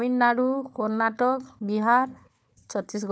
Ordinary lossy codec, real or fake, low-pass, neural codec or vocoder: none; fake; none; codec, 16 kHz, 4 kbps, FunCodec, trained on Chinese and English, 50 frames a second